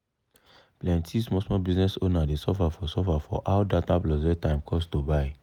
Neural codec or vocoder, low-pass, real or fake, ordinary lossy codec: none; 19.8 kHz; real; none